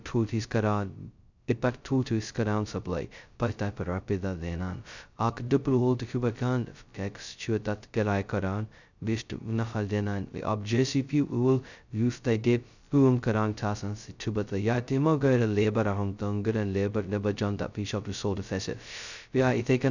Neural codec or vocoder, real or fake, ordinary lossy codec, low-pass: codec, 16 kHz, 0.2 kbps, FocalCodec; fake; none; 7.2 kHz